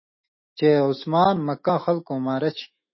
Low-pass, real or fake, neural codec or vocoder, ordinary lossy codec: 7.2 kHz; fake; autoencoder, 48 kHz, 128 numbers a frame, DAC-VAE, trained on Japanese speech; MP3, 24 kbps